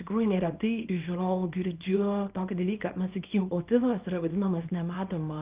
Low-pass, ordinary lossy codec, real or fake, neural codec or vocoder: 3.6 kHz; Opus, 24 kbps; fake; codec, 24 kHz, 0.9 kbps, WavTokenizer, small release